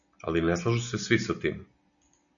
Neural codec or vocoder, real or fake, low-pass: none; real; 7.2 kHz